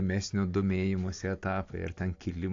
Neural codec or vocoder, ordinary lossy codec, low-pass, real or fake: none; AAC, 48 kbps; 7.2 kHz; real